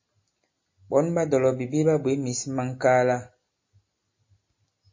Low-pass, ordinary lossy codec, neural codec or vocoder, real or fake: 7.2 kHz; MP3, 32 kbps; none; real